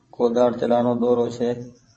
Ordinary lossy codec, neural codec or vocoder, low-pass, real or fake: MP3, 32 kbps; vocoder, 22.05 kHz, 80 mel bands, WaveNeXt; 9.9 kHz; fake